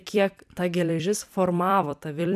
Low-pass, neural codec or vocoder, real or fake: 14.4 kHz; vocoder, 44.1 kHz, 128 mel bands every 256 samples, BigVGAN v2; fake